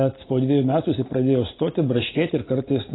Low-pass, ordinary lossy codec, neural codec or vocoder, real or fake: 7.2 kHz; AAC, 16 kbps; none; real